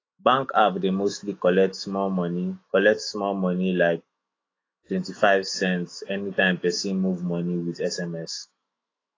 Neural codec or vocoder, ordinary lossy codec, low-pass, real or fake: autoencoder, 48 kHz, 128 numbers a frame, DAC-VAE, trained on Japanese speech; AAC, 32 kbps; 7.2 kHz; fake